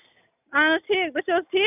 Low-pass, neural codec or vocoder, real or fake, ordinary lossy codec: 3.6 kHz; none; real; none